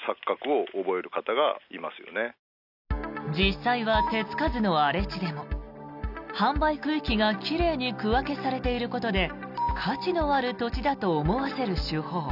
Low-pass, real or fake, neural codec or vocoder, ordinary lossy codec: 5.4 kHz; real; none; none